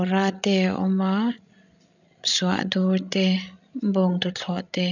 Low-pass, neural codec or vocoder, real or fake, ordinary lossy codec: 7.2 kHz; codec, 16 kHz, 16 kbps, FreqCodec, larger model; fake; none